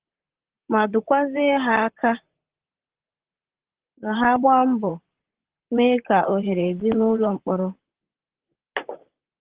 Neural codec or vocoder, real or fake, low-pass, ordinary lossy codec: vocoder, 44.1 kHz, 128 mel bands, Pupu-Vocoder; fake; 3.6 kHz; Opus, 16 kbps